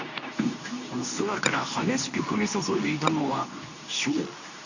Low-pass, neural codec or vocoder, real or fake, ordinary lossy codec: 7.2 kHz; codec, 24 kHz, 0.9 kbps, WavTokenizer, medium speech release version 2; fake; none